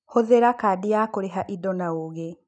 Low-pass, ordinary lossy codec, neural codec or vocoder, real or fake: 9.9 kHz; none; none; real